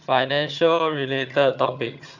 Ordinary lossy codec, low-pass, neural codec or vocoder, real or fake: none; 7.2 kHz; vocoder, 22.05 kHz, 80 mel bands, HiFi-GAN; fake